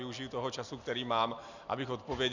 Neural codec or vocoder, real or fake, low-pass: none; real; 7.2 kHz